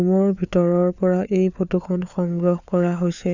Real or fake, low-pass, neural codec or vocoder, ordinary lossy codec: fake; 7.2 kHz; codec, 16 kHz, 4 kbps, FunCodec, trained on LibriTTS, 50 frames a second; none